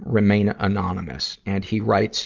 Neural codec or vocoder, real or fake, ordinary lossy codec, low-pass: none; real; Opus, 24 kbps; 7.2 kHz